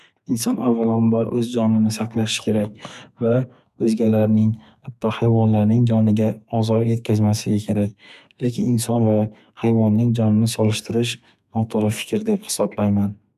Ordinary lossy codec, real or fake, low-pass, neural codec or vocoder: none; fake; 14.4 kHz; codec, 32 kHz, 1.9 kbps, SNAC